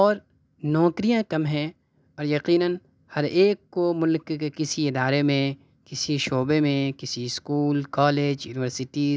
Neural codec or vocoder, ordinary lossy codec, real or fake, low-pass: none; none; real; none